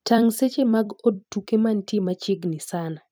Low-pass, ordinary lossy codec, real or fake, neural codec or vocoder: none; none; fake; vocoder, 44.1 kHz, 128 mel bands every 256 samples, BigVGAN v2